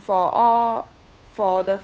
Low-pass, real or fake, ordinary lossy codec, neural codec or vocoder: none; fake; none; codec, 16 kHz, 2 kbps, FunCodec, trained on Chinese and English, 25 frames a second